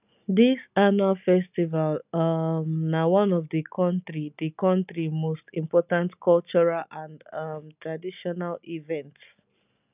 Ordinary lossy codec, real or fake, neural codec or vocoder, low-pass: none; real; none; 3.6 kHz